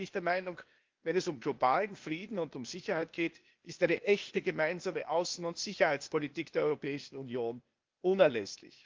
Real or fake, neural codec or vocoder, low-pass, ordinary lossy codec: fake; codec, 16 kHz, 0.8 kbps, ZipCodec; 7.2 kHz; Opus, 24 kbps